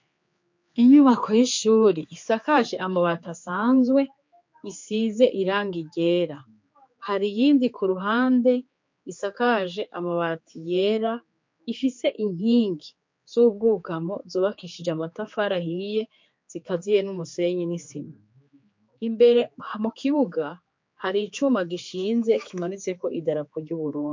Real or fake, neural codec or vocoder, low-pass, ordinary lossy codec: fake; codec, 16 kHz, 4 kbps, X-Codec, HuBERT features, trained on general audio; 7.2 kHz; MP3, 48 kbps